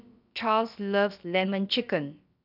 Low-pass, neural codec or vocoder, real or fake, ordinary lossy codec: 5.4 kHz; codec, 16 kHz, about 1 kbps, DyCAST, with the encoder's durations; fake; none